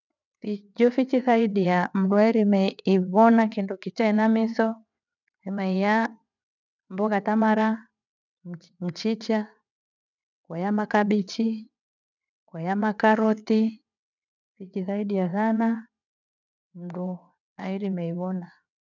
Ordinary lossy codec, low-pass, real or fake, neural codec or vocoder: none; 7.2 kHz; fake; vocoder, 22.05 kHz, 80 mel bands, WaveNeXt